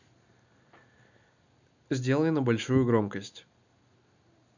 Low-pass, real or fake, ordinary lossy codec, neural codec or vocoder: 7.2 kHz; real; none; none